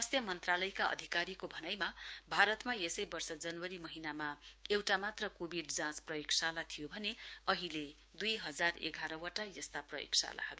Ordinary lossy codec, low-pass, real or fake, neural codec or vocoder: none; none; fake; codec, 16 kHz, 6 kbps, DAC